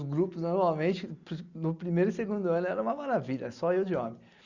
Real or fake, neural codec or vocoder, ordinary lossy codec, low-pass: real; none; Opus, 64 kbps; 7.2 kHz